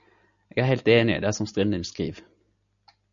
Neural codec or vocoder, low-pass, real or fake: none; 7.2 kHz; real